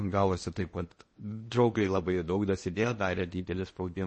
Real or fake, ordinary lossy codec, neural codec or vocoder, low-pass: fake; MP3, 32 kbps; codec, 16 kHz in and 24 kHz out, 0.8 kbps, FocalCodec, streaming, 65536 codes; 10.8 kHz